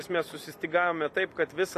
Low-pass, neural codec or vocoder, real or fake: 14.4 kHz; vocoder, 44.1 kHz, 128 mel bands every 256 samples, BigVGAN v2; fake